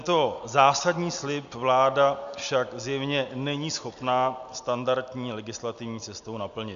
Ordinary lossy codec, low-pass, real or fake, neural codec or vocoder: AAC, 96 kbps; 7.2 kHz; real; none